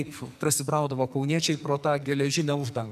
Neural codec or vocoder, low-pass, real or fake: codec, 44.1 kHz, 2.6 kbps, SNAC; 14.4 kHz; fake